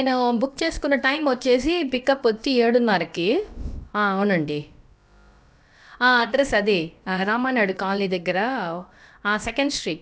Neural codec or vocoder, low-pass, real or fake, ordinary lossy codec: codec, 16 kHz, about 1 kbps, DyCAST, with the encoder's durations; none; fake; none